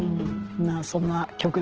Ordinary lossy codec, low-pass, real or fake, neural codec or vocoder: Opus, 16 kbps; 7.2 kHz; real; none